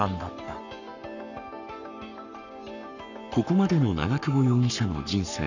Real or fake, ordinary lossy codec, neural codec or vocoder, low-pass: fake; none; codec, 44.1 kHz, 7.8 kbps, Pupu-Codec; 7.2 kHz